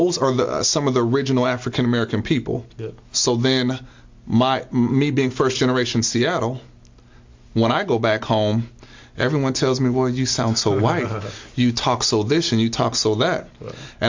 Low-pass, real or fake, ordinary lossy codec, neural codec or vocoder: 7.2 kHz; real; MP3, 48 kbps; none